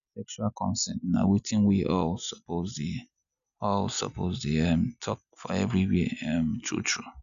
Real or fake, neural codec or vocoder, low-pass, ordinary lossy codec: real; none; 7.2 kHz; none